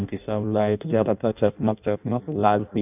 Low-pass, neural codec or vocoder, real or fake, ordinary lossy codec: 3.6 kHz; codec, 16 kHz in and 24 kHz out, 0.6 kbps, FireRedTTS-2 codec; fake; none